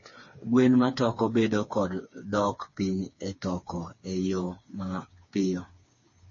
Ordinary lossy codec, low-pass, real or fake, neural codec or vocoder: MP3, 32 kbps; 7.2 kHz; fake; codec, 16 kHz, 4 kbps, FreqCodec, smaller model